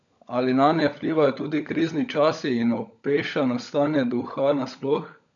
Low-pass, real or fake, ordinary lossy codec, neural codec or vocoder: 7.2 kHz; fake; none; codec, 16 kHz, 16 kbps, FunCodec, trained on LibriTTS, 50 frames a second